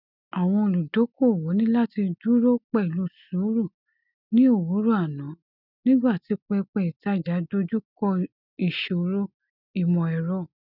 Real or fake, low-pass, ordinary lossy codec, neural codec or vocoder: real; 5.4 kHz; none; none